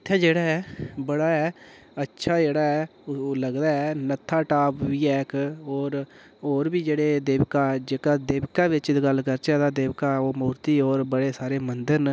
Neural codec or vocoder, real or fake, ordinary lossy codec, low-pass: none; real; none; none